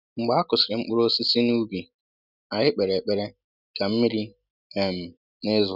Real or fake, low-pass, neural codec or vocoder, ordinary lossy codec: real; 5.4 kHz; none; none